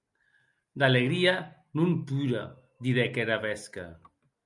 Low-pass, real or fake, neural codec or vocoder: 10.8 kHz; real; none